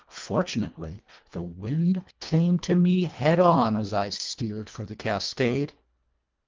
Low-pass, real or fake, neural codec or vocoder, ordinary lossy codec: 7.2 kHz; fake; codec, 24 kHz, 1.5 kbps, HILCodec; Opus, 32 kbps